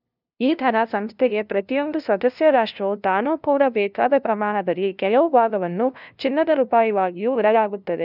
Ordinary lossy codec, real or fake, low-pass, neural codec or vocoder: none; fake; 5.4 kHz; codec, 16 kHz, 0.5 kbps, FunCodec, trained on LibriTTS, 25 frames a second